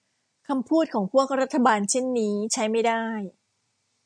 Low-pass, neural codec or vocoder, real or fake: 9.9 kHz; none; real